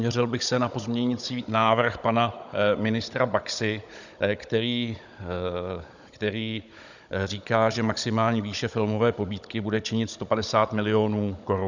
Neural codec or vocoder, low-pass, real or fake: codec, 16 kHz, 16 kbps, FunCodec, trained on Chinese and English, 50 frames a second; 7.2 kHz; fake